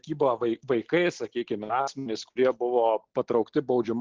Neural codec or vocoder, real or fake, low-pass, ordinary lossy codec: none; real; 7.2 kHz; Opus, 16 kbps